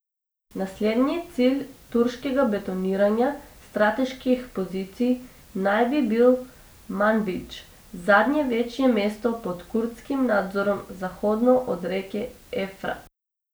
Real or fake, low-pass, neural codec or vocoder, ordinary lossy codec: real; none; none; none